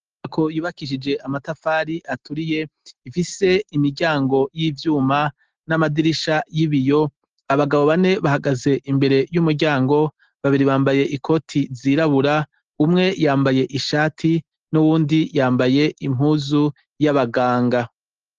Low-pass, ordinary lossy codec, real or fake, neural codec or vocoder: 7.2 kHz; Opus, 16 kbps; real; none